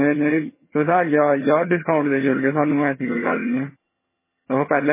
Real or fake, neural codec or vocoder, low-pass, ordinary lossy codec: fake; vocoder, 22.05 kHz, 80 mel bands, HiFi-GAN; 3.6 kHz; MP3, 16 kbps